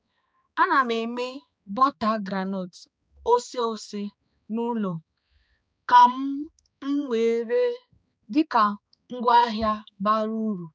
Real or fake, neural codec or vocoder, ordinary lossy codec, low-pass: fake; codec, 16 kHz, 2 kbps, X-Codec, HuBERT features, trained on balanced general audio; none; none